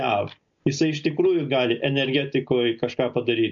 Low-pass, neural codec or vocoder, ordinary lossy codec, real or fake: 7.2 kHz; none; MP3, 48 kbps; real